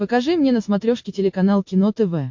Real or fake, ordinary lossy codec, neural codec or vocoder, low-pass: real; MP3, 48 kbps; none; 7.2 kHz